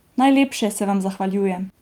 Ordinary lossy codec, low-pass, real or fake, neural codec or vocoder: Opus, 32 kbps; 19.8 kHz; real; none